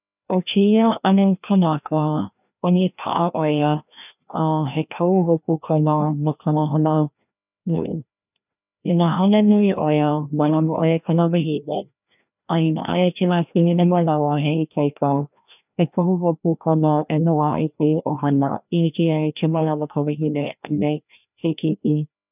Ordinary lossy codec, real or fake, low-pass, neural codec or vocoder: none; fake; 3.6 kHz; codec, 16 kHz, 1 kbps, FreqCodec, larger model